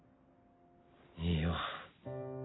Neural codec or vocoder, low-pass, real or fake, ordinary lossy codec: none; 7.2 kHz; real; AAC, 16 kbps